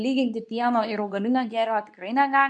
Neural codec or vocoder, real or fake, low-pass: codec, 24 kHz, 0.9 kbps, WavTokenizer, medium speech release version 2; fake; 10.8 kHz